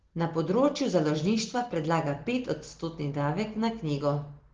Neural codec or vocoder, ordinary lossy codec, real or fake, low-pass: none; Opus, 16 kbps; real; 7.2 kHz